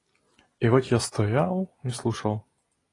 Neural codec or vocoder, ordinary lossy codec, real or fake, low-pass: none; AAC, 32 kbps; real; 10.8 kHz